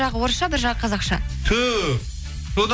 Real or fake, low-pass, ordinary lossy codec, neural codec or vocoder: real; none; none; none